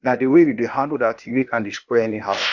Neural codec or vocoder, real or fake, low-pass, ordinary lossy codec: codec, 16 kHz, 0.8 kbps, ZipCodec; fake; 7.2 kHz; none